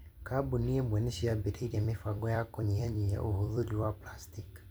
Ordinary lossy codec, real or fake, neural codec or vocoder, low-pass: none; fake; vocoder, 44.1 kHz, 128 mel bands every 256 samples, BigVGAN v2; none